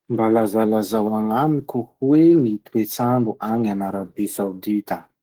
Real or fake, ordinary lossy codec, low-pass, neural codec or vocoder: fake; Opus, 16 kbps; 19.8 kHz; autoencoder, 48 kHz, 128 numbers a frame, DAC-VAE, trained on Japanese speech